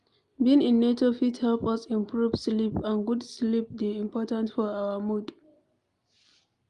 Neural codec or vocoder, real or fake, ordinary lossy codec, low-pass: none; real; Opus, 32 kbps; 10.8 kHz